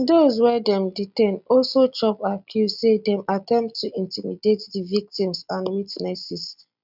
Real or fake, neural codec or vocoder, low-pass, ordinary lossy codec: real; none; 5.4 kHz; none